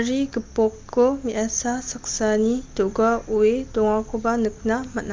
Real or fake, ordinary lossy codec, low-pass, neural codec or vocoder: real; Opus, 24 kbps; 7.2 kHz; none